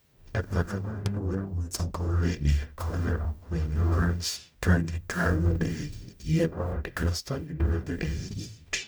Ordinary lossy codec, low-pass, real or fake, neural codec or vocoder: none; none; fake; codec, 44.1 kHz, 0.9 kbps, DAC